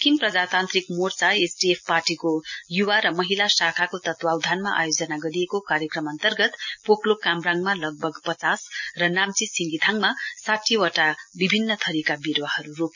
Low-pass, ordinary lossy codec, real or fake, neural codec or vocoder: 7.2 kHz; none; real; none